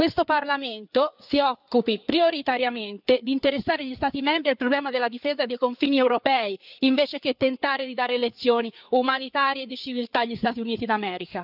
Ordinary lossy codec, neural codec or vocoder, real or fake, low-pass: none; codec, 16 kHz, 4 kbps, FreqCodec, larger model; fake; 5.4 kHz